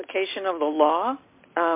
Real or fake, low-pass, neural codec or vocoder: real; 3.6 kHz; none